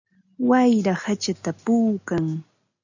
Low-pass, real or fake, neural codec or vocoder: 7.2 kHz; real; none